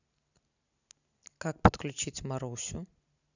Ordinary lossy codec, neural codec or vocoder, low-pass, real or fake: none; none; 7.2 kHz; real